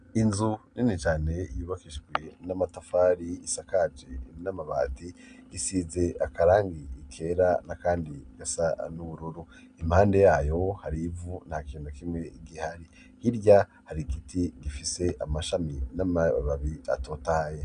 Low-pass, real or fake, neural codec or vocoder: 9.9 kHz; real; none